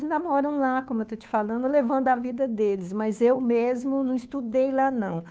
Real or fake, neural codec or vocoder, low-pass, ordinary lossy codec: fake; codec, 16 kHz, 2 kbps, FunCodec, trained on Chinese and English, 25 frames a second; none; none